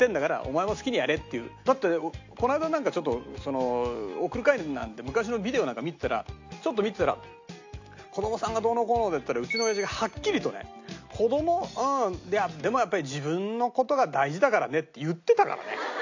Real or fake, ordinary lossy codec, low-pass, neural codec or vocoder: real; AAC, 48 kbps; 7.2 kHz; none